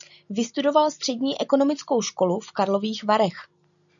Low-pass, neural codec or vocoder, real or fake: 7.2 kHz; none; real